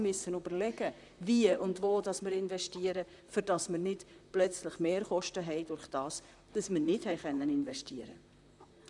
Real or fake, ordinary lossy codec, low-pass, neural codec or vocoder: fake; none; 10.8 kHz; vocoder, 44.1 kHz, 128 mel bands, Pupu-Vocoder